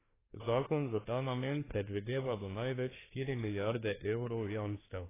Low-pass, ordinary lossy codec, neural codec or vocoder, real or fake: 3.6 kHz; AAC, 16 kbps; codec, 24 kHz, 1 kbps, SNAC; fake